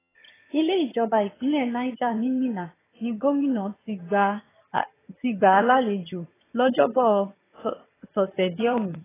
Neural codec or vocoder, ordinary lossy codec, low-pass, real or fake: vocoder, 22.05 kHz, 80 mel bands, HiFi-GAN; AAC, 16 kbps; 3.6 kHz; fake